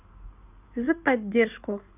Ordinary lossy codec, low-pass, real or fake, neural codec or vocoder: none; 3.6 kHz; fake; codec, 16 kHz in and 24 kHz out, 2.2 kbps, FireRedTTS-2 codec